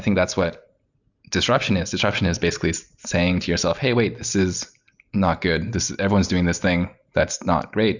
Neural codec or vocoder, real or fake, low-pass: none; real; 7.2 kHz